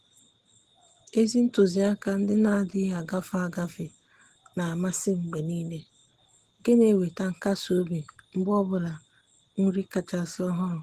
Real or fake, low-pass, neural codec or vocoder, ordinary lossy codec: real; 14.4 kHz; none; Opus, 16 kbps